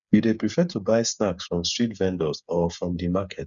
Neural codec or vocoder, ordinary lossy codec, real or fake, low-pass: codec, 16 kHz, 8 kbps, FreqCodec, smaller model; none; fake; 7.2 kHz